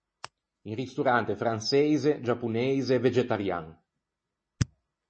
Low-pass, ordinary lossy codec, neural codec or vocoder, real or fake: 9.9 kHz; MP3, 32 kbps; none; real